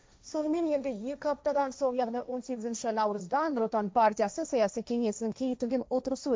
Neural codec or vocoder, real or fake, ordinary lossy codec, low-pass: codec, 16 kHz, 1.1 kbps, Voila-Tokenizer; fake; none; none